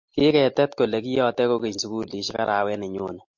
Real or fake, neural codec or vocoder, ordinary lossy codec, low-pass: real; none; MP3, 48 kbps; 7.2 kHz